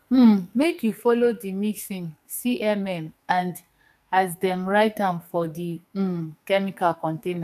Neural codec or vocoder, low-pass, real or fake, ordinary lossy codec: codec, 44.1 kHz, 2.6 kbps, SNAC; 14.4 kHz; fake; none